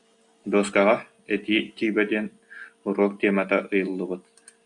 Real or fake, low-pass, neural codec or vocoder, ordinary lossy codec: real; 10.8 kHz; none; AAC, 64 kbps